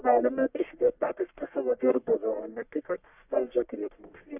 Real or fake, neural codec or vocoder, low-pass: fake; codec, 44.1 kHz, 1.7 kbps, Pupu-Codec; 3.6 kHz